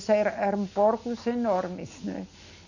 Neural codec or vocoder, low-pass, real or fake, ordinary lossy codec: none; 7.2 kHz; real; none